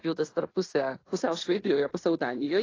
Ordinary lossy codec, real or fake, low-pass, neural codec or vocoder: AAC, 32 kbps; fake; 7.2 kHz; codec, 16 kHz in and 24 kHz out, 0.9 kbps, LongCat-Audio-Codec, fine tuned four codebook decoder